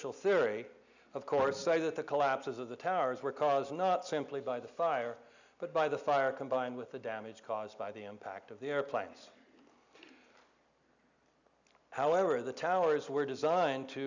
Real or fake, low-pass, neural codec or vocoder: real; 7.2 kHz; none